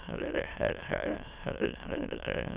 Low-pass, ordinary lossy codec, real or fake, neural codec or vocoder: 3.6 kHz; Opus, 64 kbps; fake; autoencoder, 22.05 kHz, a latent of 192 numbers a frame, VITS, trained on many speakers